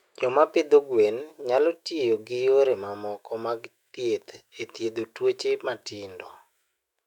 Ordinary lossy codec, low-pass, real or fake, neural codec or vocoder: none; 19.8 kHz; fake; autoencoder, 48 kHz, 128 numbers a frame, DAC-VAE, trained on Japanese speech